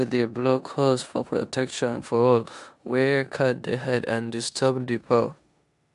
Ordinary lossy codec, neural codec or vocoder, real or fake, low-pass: none; codec, 16 kHz in and 24 kHz out, 0.9 kbps, LongCat-Audio-Codec, four codebook decoder; fake; 10.8 kHz